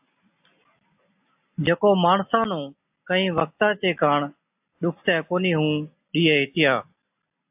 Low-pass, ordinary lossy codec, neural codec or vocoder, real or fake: 3.6 kHz; AAC, 32 kbps; none; real